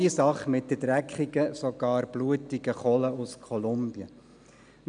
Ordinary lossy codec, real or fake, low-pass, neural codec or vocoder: none; real; 9.9 kHz; none